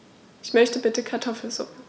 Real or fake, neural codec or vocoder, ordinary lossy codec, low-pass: real; none; none; none